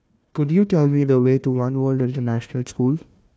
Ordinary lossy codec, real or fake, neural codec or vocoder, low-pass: none; fake; codec, 16 kHz, 1 kbps, FunCodec, trained on Chinese and English, 50 frames a second; none